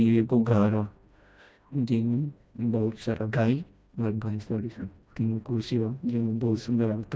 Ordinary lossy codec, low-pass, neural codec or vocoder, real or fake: none; none; codec, 16 kHz, 1 kbps, FreqCodec, smaller model; fake